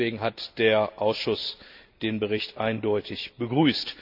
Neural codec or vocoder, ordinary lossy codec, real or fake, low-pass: none; Opus, 64 kbps; real; 5.4 kHz